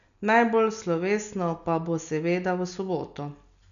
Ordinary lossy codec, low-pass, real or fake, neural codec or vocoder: MP3, 96 kbps; 7.2 kHz; real; none